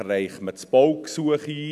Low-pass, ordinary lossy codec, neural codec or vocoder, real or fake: 14.4 kHz; none; none; real